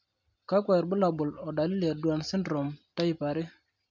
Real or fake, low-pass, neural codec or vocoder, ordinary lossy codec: real; 7.2 kHz; none; MP3, 64 kbps